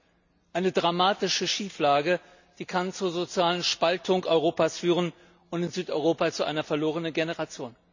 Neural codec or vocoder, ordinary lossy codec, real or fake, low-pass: none; none; real; 7.2 kHz